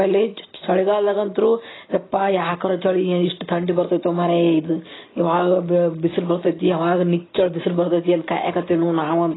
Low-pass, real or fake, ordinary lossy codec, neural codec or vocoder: 7.2 kHz; real; AAC, 16 kbps; none